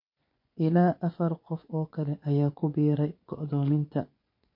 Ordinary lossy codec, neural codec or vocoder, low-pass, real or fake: MP3, 32 kbps; vocoder, 24 kHz, 100 mel bands, Vocos; 5.4 kHz; fake